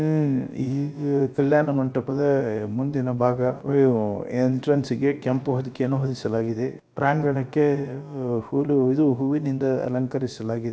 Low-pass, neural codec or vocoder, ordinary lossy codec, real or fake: none; codec, 16 kHz, about 1 kbps, DyCAST, with the encoder's durations; none; fake